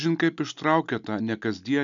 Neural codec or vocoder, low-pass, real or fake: none; 7.2 kHz; real